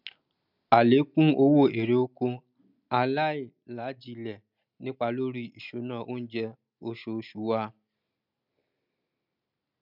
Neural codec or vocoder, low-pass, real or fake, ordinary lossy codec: none; 5.4 kHz; real; none